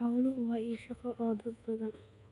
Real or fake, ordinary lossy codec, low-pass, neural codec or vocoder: fake; none; 10.8 kHz; codec, 24 kHz, 1.2 kbps, DualCodec